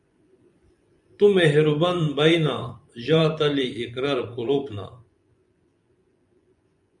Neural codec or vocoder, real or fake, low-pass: vocoder, 24 kHz, 100 mel bands, Vocos; fake; 10.8 kHz